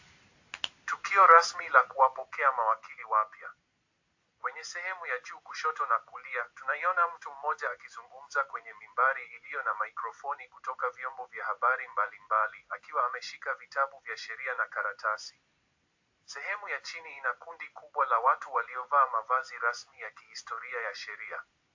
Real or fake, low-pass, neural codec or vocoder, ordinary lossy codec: real; 7.2 kHz; none; AAC, 48 kbps